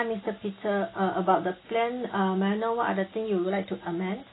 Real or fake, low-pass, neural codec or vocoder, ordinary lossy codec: real; 7.2 kHz; none; AAC, 16 kbps